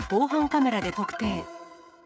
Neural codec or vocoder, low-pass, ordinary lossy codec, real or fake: codec, 16 kHz, 16 kbps, FreqCodec, smaller model; none; none; fake